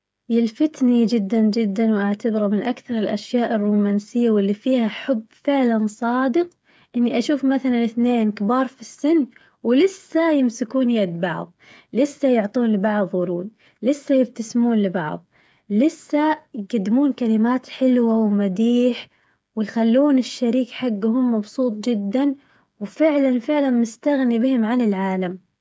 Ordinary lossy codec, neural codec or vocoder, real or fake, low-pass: none; codec, 16 kHz, 8 kbps, FreqCodec, smaller model; fake; none